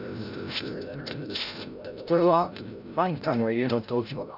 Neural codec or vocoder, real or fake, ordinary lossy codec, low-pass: codec, 16 kHz, 0.5 kbps, FreqCodec, larger model; fake; none; 5.4 kHz